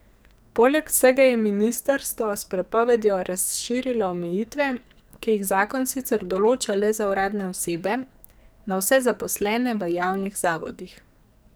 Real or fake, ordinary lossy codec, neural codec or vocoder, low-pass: fake; none; codec, 44.1 kHz, 2.6 kbps, SNAC; none